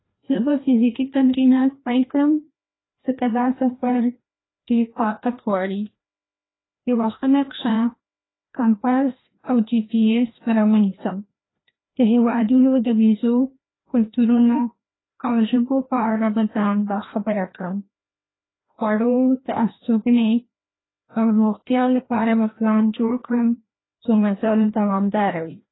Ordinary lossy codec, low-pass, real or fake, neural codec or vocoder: AAC, 16 kbps; 7.2 kHz; fake; codec, 16 kHz, 1 kbps, FreqCodec, larger model